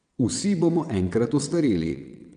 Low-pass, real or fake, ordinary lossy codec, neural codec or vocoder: 9.9 kHz; fake; none; vocoder, 22.05 kHz, 80 mel bands, WaveNeXt